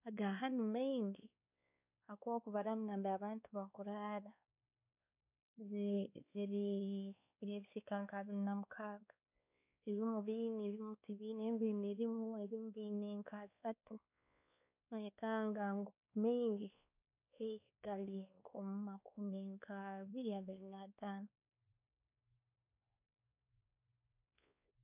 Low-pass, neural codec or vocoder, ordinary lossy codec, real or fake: 3.6 kHz; codec, 16 kHz, 2 kbps, X-Codec, WavLM features, trained on Multilingual LibriSpeech; none; fake